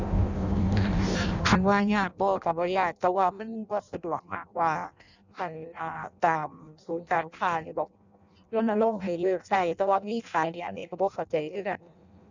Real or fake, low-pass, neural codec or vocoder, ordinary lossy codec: fake; 7.2 kHz; codec, 16 kHz in and 24 kHz out, 0.6 kbps, FireRedTTS-2 codec; none